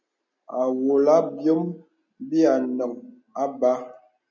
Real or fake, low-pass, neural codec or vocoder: real; 7.2 kHz; none